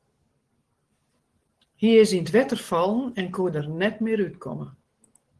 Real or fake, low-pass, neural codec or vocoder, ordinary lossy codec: real; 10.8 kHz; none; Opus, 16 kbps